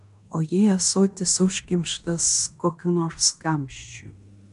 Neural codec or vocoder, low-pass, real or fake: codec, 16 kHz in and 24 kHz out, 0.9 kbps, LongCat-Audio-Codec, fine tuned four codebook decoder; 10.8 kHz; fake